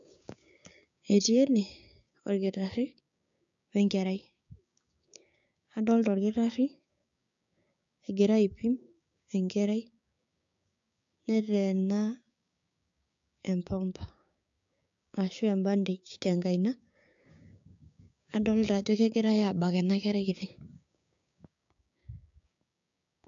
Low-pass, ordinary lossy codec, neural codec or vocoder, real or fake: 7.2 kHz; AAC, 64 kbps; codec, 16 kHz, 6 kbps, DAC; fake